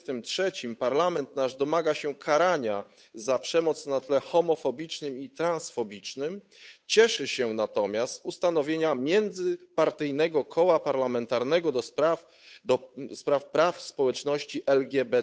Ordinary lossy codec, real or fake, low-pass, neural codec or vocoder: none; fake; none; codec, 16 kHz, 8 kbps, FunCodec, trained on Chinese and English, 25 frames a second